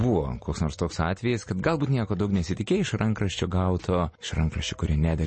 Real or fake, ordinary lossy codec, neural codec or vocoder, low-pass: real; MP3, 32 kbps; none; 10.8 kHz